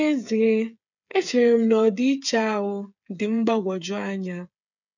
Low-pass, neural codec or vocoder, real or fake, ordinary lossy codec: 7.2 kHz; codec, 16 kHz, 8 kbps, FreqCodec, smaller model; fake; none